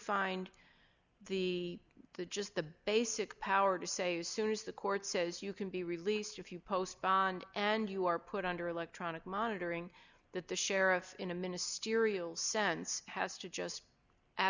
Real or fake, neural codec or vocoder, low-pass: real; none; 7.2 kHz